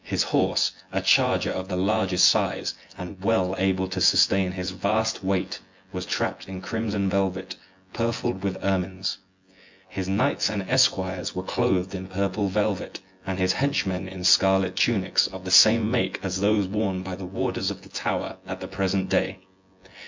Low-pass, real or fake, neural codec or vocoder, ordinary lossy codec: 7.2 kHz; fake; vocoder, 24 kHz, 100 mel bands, Vocos; MP3, 64 kbps